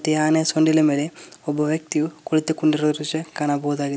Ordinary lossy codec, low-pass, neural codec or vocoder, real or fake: none; none; none; real